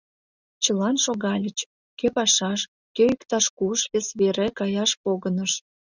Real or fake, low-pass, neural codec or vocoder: real; 7.2 kHz; none